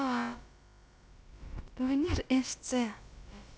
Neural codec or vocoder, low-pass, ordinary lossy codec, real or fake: codec, 16 kHz, about 1 kbps, DyCAST, with the encoder's durations; none; none; fake